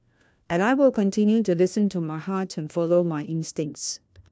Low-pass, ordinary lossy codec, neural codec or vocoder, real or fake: none; none; codec, 16 kHz, 1 kbps, FunCodec, trained on LibriTTS, 50 frames a second; fake